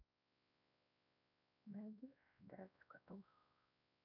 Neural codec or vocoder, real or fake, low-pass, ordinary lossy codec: codec, 16 kHz, 1 kbps, X-Codec, WavLM features, trained on Multilingual LibriSpeech; fake; 5.4 kHz; none